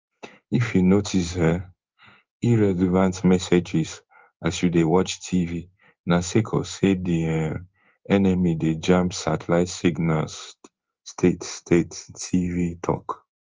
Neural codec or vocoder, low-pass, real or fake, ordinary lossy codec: codec, 16 kHz in and 24 kHz out, 1 kbps, XY-Tokenizer; 7.2 kHz; fake; Opus, 24 kbps